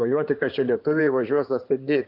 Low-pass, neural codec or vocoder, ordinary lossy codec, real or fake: 5.4 kHz; codec, 16 kHz in and 24 kHz out, 2.2 kbps, FireRedTTS-2 codec; AAC, 48 kbps; fake